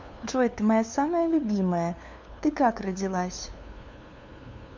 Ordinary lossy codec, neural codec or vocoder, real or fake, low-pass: MP3, 64 kbps; codec, 16 kHz, 2 kbps, FunCodec, trained on LibriTTS, 25 frames a second; fake; 7.2 kHz